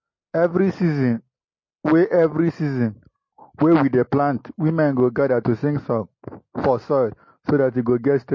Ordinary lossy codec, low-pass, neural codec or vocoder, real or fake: MP3, 32 kbps; 7.2 kHz; none; real